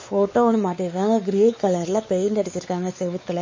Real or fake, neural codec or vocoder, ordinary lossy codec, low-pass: fake; codec, 16 kHz, 4 kbps, X-Codec, WavLM features, trained on Multilingual LibriSpeech; MP3, 32 kbps; 7.2 kHz